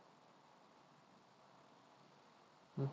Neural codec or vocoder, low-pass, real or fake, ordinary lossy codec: none; none; real; none